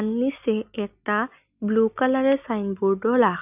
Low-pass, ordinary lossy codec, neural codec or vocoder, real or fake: 3.6 kHz; none; none; real